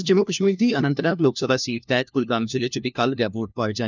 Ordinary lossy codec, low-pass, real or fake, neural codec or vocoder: none; 7.2 kHz; fake; codec, 16 kHz, 1 kbps, FunCodec, trained on LibriTTS, 50 frames a second